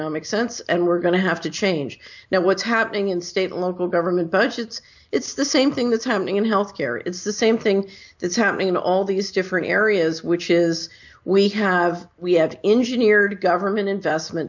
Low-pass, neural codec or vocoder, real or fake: 7.2 kHz; none; real